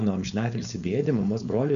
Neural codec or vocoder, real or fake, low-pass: codec, 16 kHz, 4.8 kbps, FACodec; fake; 7.2 kHz